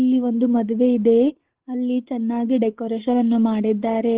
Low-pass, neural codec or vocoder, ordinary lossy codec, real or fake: 3.6 kHz; none; Opus, 16 kbps; real